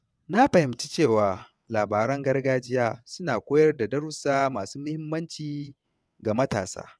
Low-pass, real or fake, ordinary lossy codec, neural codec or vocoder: none; fake; none; vocoder, 22.05 kHz, 80 mel bands, WaveNeXt